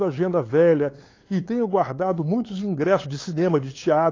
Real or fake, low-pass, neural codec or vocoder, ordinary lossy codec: fake; 7.2 kHz; codec, 16 kHz, 8 kbps, FunCodec, trained on LibriTTS, 25 frames a second; AAC, 32 kbps